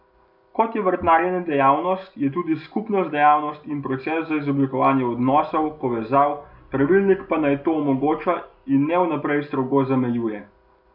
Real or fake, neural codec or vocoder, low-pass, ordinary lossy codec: real; none; 5.4 kHz; none